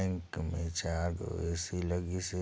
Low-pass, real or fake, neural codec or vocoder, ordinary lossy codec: none; real; none; none